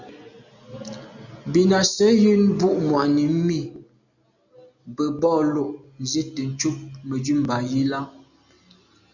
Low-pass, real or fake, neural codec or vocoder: 7.2 kHz; real; none